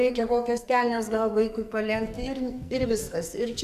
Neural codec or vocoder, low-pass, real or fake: codec, 44.1 kHz, 2.6 kbps, SNAC; 14.4 kHz; fake